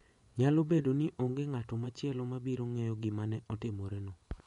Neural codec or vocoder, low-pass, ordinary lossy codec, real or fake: vocoder, 24 kHz, 100 mel bands, Vocos; 10.8 kHz; MP3, 64 kbps; fake